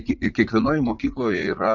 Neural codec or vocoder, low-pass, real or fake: vocoder, 44.1 kHz, 80 mel bands, Vocos; 7.2 kHz; fake